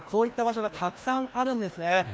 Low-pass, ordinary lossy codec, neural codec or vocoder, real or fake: none; none; codec, 16 kHz, 1 kbps, FreqCodec, larger model; fake